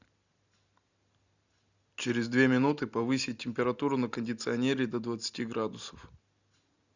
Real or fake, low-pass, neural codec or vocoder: real; 7.2 kHz; none